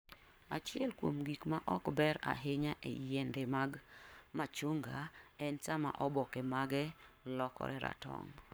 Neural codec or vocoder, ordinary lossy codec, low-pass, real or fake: codec, 44.1 kHz, 7.8 kbps, Pupu-Codec; none; none; fake